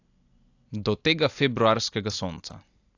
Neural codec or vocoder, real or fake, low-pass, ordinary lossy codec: none; real; 7.2 kHz; AAC, 48 kbps